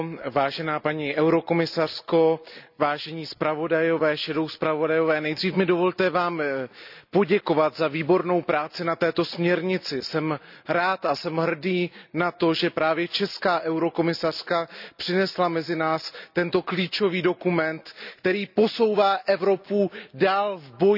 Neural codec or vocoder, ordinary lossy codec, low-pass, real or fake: none; none; 5.4 kHz; real